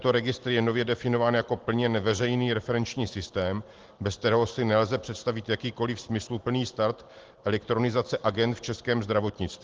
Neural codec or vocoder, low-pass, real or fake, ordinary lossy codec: none; 7.2 kHz; real; Opus, 16 kbps